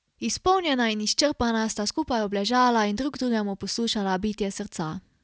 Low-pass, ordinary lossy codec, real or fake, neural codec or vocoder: none; none; real; none